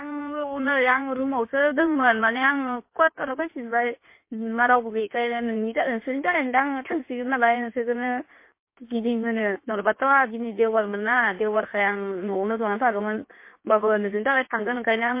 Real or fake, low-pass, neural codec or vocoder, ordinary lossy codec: fake; 3.6 kHz; codec, 16 kHz in and 24 kHz out, 1.1 kbps, FireRedTTS-2 codec; MP3, 24 kbps